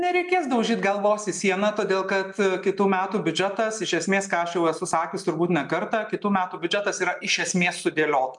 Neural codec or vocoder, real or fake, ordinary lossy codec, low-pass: none; real; MP3, 96 kbps; 10.8 kHz